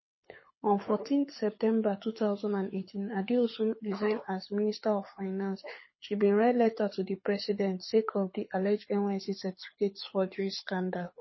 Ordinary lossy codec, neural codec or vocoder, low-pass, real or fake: MP3, 24 kbps; codec, 24 kHz, 6 kbps, HILCodec; 7.2 kHz; fake